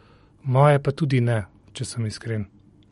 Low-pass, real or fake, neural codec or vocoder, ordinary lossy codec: 19.8 kHz; real; none; MP3, 48 kbps